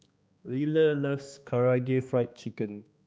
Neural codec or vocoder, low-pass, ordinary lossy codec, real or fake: codec, 16 kHz, 2 kbps, X-Codec, HuBERT features, trained on balanced general audio; none; none; fake